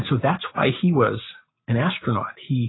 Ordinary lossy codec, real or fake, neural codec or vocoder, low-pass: AAC, 16 kbps; real; none; 7.2 kHz